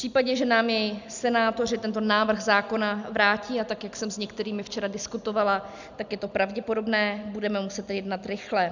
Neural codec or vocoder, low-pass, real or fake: none; 7.2 kHz; real